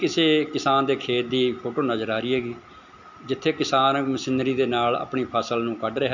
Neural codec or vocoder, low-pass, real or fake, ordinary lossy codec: none; 7.2 kHz; real; none